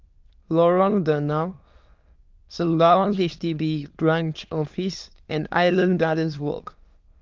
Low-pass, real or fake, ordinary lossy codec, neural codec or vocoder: 7.2 kHz; fake; Opus, 32 kbps; autoencoder, 22.05 kHz, a latent of 192 numbers a frame, VITS, trained on many speakers